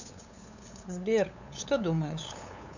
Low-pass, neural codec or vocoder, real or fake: 7.2 kHz; codec, 16 kHz, 8 kbps, FunCodec, trained on LibriTTS, 25 frames a second; fake